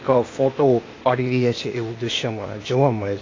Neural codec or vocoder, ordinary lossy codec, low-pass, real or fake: codec, 16 kHz, 0.8 kbps, ZipCodec; AAC, 32 kbps; 7.2 kHz; fake